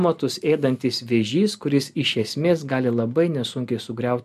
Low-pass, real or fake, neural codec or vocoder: 14.4 kHz; real; none